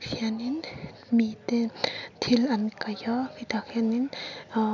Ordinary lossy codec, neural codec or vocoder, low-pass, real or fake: none; none; 7.2 kHz; real